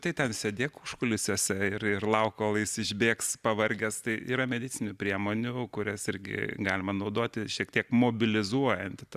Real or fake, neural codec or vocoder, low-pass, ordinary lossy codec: real; none; 14.4 kHz; Opus, 64 kbps